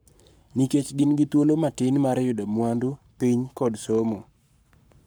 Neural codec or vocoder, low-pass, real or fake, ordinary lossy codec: codec, 44.1 kHz, 7.8 kbps, Pupu-Codec; none; fake; none